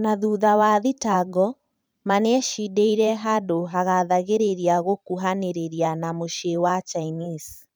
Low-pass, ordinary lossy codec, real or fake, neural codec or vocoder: none; none; fake; vocoder, 44.1 kHz, 128 mel bands every 512 samples, BigVGAN v2